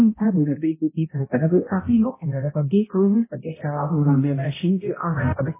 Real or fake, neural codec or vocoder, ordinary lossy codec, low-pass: fake; codec, 16 kHz, 0.5 kbps, X-Codec, HuBERT features, trained on balanced general audio; MP3, 16 kbps; 3.6 kHz